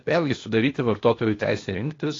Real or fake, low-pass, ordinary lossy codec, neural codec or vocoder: fake; 7.2 kHz; AAC, 32 kbps; codec, 16 kHz, 0.8 kbps, ZipCodec